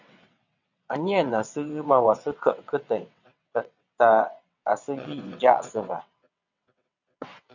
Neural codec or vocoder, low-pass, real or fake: vocoder, 22.05 kHz, 80 mel bands, WaveNeXt; 7.2 kHz; fake